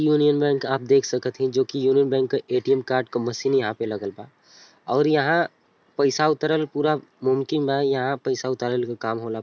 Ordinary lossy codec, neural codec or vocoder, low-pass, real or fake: none; none; none; real